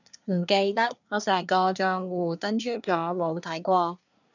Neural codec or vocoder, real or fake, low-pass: codec, 24 kHz, 1 kbps, SNAC; fake; 7.2 kHz